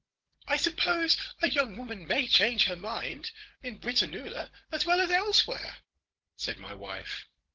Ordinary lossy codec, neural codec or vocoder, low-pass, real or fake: Opus, 16 kbps; codec, 16 kHz, 16 kbps, FunCodec, trained on Chinese and English, 50 frames a second; 7.2 kHz; fake